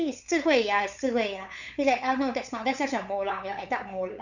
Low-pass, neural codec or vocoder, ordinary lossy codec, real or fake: 7.2 kHz; codec, 16 kHz, 8 kbps, FunCodec, trained on LibriTTS, 25 frames a second; none; fake